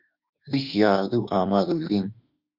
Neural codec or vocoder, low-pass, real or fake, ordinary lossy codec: autoencoder, 48 kHz, 32 numbers a frame, DAC-VAE, trained on Japanese speech; 5.4 kHz; fake; Opus, 64 kbps